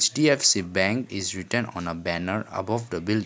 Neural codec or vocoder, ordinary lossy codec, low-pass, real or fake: none; none; none; real